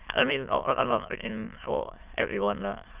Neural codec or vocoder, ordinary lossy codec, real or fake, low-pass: autoencoder, 22.05 kHz, a latent of 192 numbers a frame, VITS, trained on many speakers; Opus, 32 kbps; fake; 3.6 kHz